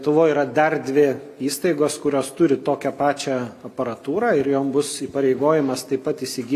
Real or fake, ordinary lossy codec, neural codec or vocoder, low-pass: real; AAC, 64 kbps; none; 14.4 kHz